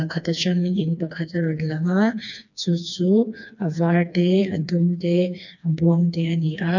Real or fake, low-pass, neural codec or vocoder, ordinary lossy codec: fake; 7.2 kHz; codec, 16 kHz, 2 kbps, FreqCodec, smaller model; none